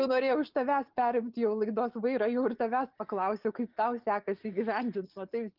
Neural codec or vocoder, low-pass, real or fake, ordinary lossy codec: none; 5.4 kHz; real; Opus, 24 kbps